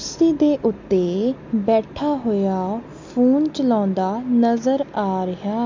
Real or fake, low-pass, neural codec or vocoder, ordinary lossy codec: real; 7.2 kHz; none; MP3, 48 kbps